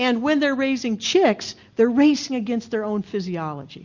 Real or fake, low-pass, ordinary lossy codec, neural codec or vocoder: real; 7.2 kHz; Opus, 64 kbps; none